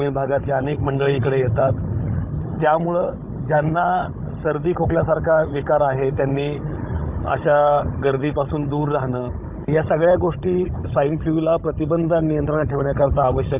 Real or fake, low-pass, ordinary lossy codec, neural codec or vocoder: fake; 3.6 kHz; Opus, 64 kbps; codec, 16 kHz, 16 kbps, FunCodec, trained on Chinese and English, 50 frames a second